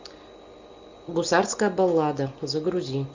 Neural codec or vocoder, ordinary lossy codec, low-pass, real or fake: none; MP3, 64 kbps; 7.2 kHz; real